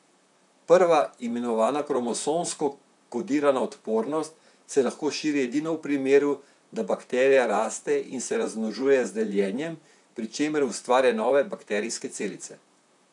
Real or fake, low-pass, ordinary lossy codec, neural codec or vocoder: fake; 10.8 kHz; none; vocoder, 44.1 kHz, 128 mel bands, Pupu-Vocoder